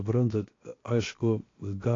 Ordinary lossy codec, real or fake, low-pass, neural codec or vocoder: AAC, 32 kbps; fake; 7.2 kHz; codec, 16 kHz, 0.7 kbps, FocalCodec